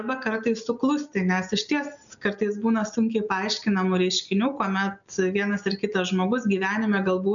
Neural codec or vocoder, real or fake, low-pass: none; real; 7.2 kHz